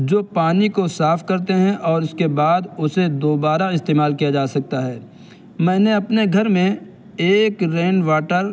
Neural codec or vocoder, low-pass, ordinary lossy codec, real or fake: none; none; none; real